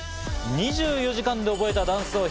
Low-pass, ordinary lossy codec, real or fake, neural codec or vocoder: none; none; real; none